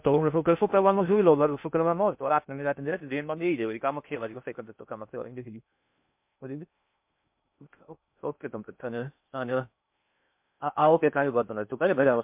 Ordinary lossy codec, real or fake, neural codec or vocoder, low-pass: MP3, 32 kbps; fake; codec, 16 kHz in and 24 kHz out, 0.6 kbps, FocalCodec, streaming, 2048 codes; 3.6 kHz